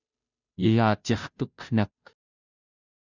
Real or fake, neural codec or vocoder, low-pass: fake; codec, 16 kHz, 0.5 kbps, FunCodec, trained on Chinese and English, 25 frames a second; 7.2 kHz